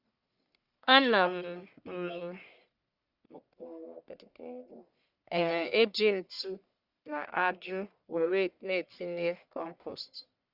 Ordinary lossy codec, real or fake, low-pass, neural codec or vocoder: Opus, 64 kbps; fake; 5.4 kHz; codec, 44.1 kHz, 1.7 kbps, Pupu-Codec